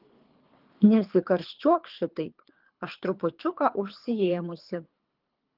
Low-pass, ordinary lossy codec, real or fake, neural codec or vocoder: 5.4 kHz; Opus, 32 kbps; fake; codec, 24 kHz, 3 kbps, HILCodec